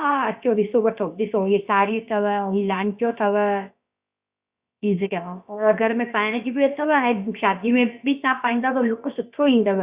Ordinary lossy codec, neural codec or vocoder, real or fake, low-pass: Opus, 64 kbps; codec, 16 kHz, about 1 kbps, DyCAST, with the encoder's durations; fake; 3.6 kHz